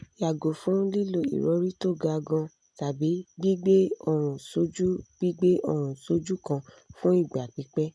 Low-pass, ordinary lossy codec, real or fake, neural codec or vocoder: none; none; real; none